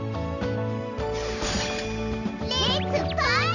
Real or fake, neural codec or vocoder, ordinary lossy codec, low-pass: real; none; none; 7.2 kHz